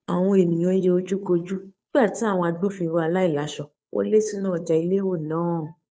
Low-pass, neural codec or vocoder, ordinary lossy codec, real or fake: none; codec, 16 kHz, 2 kbps, FunCodec, trained on Chinese and English, 25 frames a second; none; fake